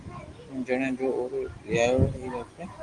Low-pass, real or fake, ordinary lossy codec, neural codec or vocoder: 10.8 kHz; real; Opus, 24 kbps; none